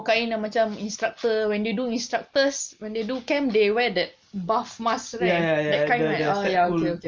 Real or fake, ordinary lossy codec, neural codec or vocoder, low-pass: real; Opus, 24 kbps; none; 7.2 kHz